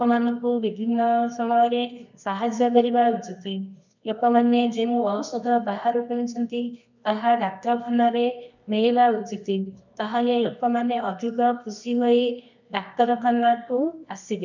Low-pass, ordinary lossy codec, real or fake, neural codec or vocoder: 7.2 kHz; none; fake; codec, 24 kHz, 0.9 kbps, WavTokenizer, medium music audio release